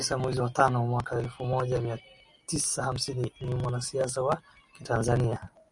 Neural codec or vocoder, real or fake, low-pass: none; real; 10.8 kHz